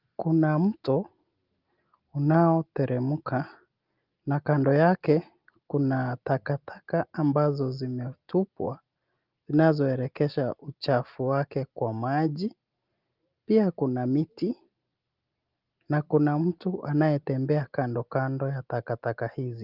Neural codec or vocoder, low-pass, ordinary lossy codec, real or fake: none; 5.4 kHz; Opus, 32 kbps; real